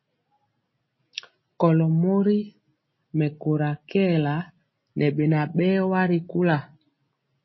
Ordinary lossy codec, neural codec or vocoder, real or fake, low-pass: MP3, 24 kbps; none; real; 7.2 kHz